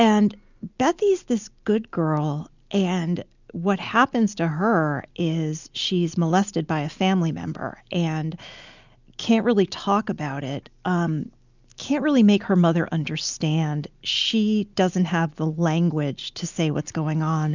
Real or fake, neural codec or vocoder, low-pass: real; none; 7.2 kHz